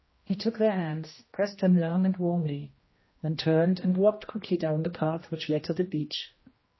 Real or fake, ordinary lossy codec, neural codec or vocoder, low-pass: fake; MP3, 24 kbps; codec, 16 kHz, 1 kbps, X-Codec, HuBERT features, trained on general audio; 7.2 kHz